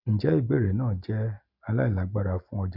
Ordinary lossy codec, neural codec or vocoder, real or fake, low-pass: none; none; real; 5.4 kHz